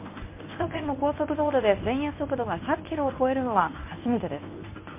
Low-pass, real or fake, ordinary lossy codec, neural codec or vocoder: 3.6 kHz; fake; MP3, 24 kbps; codec, 24 kHz, 0.9 kbps, WavTokenizer, medium speech release version 1